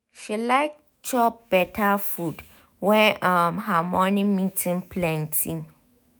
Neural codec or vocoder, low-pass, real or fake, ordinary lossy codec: autoencoder, 48 kHz, 128 numbers a frame, DAC-VAE, trained on Japanese speech; none; fake; none